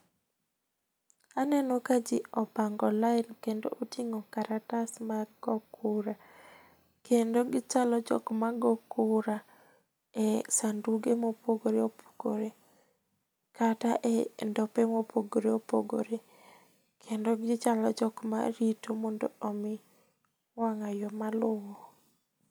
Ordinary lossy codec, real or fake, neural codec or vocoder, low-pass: none; real; none; none